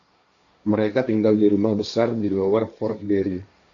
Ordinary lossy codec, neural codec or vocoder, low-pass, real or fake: AAC, 48 kbps; codec, 16 kHz, 1.1 kbps, Voila-Tokenizer; 7.2 kHz; fake